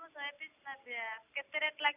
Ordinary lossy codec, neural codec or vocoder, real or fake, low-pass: none; none; real; 3.6 kHz